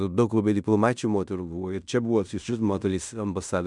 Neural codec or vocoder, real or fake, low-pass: codec, 16 kHz in and 24 kHz out, 0.9 kbps, LongCat-Audio-Codec, fine tuned four codebook decoder; fake; 10.8 kHz